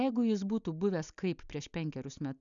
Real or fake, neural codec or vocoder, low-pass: real; none; 7.2 kHz